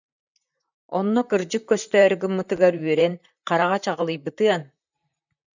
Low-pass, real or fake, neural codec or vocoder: 7.2 kHz; fake; vocoder, 44.1 kHz, 128 mel bands, Pupu-Vocoder